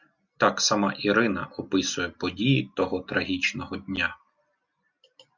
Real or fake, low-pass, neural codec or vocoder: real; 7.2 kHz; none